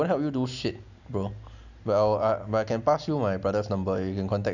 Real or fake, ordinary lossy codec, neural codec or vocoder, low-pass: real; none; none; 7.2 kHz